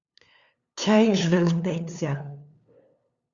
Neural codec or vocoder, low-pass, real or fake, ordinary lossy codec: codec, 16 kHz, 2 kbps, FunCodec, trained on LibriTTS, 25 frames a second; 7.2 kHz; fake; Opus, 64 kbps